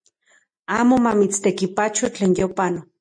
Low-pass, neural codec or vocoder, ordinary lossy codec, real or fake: 9.9 kHz; none; MP3, 48 kbps; real